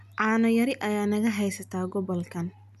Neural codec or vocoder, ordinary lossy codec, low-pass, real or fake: none; none; 14.4 kHz; real